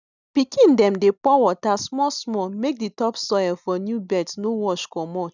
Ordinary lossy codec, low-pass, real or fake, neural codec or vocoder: none; 7.2 kHz; real; none